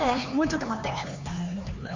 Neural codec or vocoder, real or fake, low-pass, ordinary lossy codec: codec, 16 kHz, 4 kbps, X-Codec, HuBERT features, trained on LibriSpeech; fake; 7.2 kHz; MP3, 48 kbps